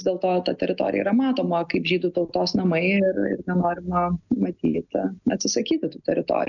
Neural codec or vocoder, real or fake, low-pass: none; real; 7.2 kHz